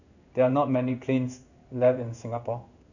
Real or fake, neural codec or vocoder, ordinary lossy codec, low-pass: fake; codec, 16 kHz in and 24 kHz out, 1 kbps, XY-Tokenizer; none; 7.2 kHz